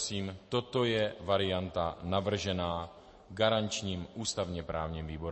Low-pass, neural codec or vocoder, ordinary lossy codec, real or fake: 10.8 kHz; none; MP3, 32 kbps; real